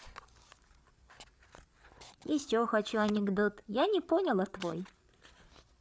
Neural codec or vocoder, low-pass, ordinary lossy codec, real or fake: codec, 16 kHz, 8 kbps, FreqCodec, larger model; none; none; fake